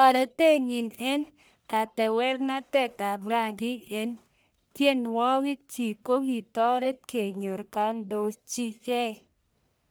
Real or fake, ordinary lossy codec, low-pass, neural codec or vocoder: fake; none; none; codec, 44.1 kHz, 1.7 kbps, Pupu-Codec